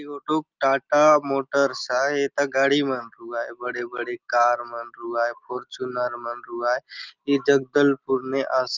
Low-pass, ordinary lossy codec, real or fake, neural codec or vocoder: 7.2 kHz; Opus, 32 kbps; real; none